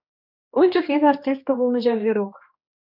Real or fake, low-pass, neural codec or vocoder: fake; 5.4 kHz; codec, 16 kHz, 2 kbps, X-Codec, HuBERT features, trained on general audio